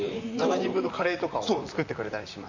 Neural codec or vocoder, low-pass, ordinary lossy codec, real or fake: vocoder, 44.1 kHz, 128 mel bands, Pupu-Vocoder; 7.2 kHz; none; fake